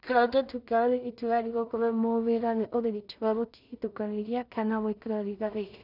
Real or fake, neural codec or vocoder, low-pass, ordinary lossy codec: fake; codec, 16 kHz in and 24 kHz out, 0.4 kbps, LongCat-Audio-Codec, two codebook decoder; 5.4 kHz; none